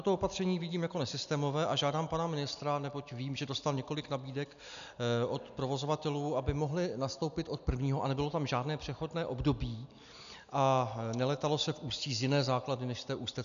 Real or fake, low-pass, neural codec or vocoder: real; 7.2 kHz; none